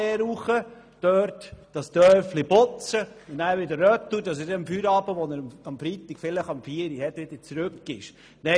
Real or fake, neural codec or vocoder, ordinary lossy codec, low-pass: real; none; none; none